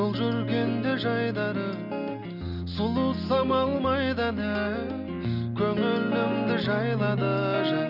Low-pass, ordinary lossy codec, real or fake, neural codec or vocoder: 5.4 kHz; none; real; none